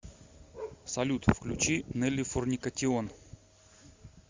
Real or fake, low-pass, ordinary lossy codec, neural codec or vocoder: real; 7.2 kHz; MP3, 64 kbps; none